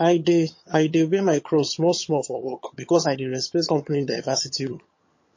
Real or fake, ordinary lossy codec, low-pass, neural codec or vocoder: fake; MP3, 32 kbps; 7.2 kHz; vocoder, 22.05 kHz, 80 mel bands, HiFi-GAN